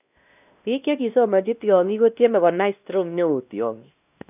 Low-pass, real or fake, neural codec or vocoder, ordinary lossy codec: 3.6 kHz; fake; codec, 16 kHz, 1 kbps, X-Codec, WavLM features, trained on Multilingual LibriSpeech; none